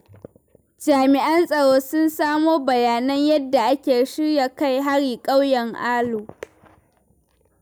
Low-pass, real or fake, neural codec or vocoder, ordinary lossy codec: none; real; none; none